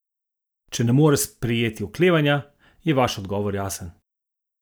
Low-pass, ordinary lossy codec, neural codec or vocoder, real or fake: none; none; none; real